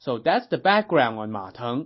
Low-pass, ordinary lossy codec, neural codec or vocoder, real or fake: 7.2 kHz; MP3, 24 kbps; none; real